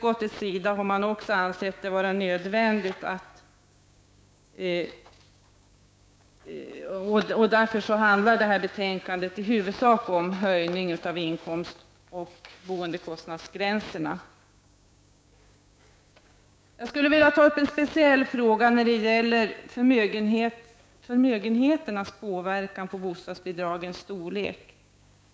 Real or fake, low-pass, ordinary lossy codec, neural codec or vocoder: fake; none; none; codec, 16 kHz, 6 kbps, DAC